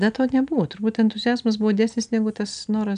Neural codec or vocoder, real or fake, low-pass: none; real; 9.9 kHz